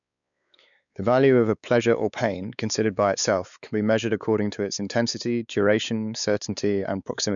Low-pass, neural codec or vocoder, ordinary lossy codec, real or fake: 7.2 kHz; codec, 16 kHz, 4 kbps, X-Codec, WavLM features, trained on Multilingual LibriSpeech; none; fake